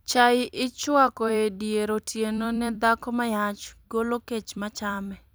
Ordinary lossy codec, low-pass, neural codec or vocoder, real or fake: none; none; vocoder, 44.1 kHz, 128 mel bands every 256 samples, BigVGAN v2; fake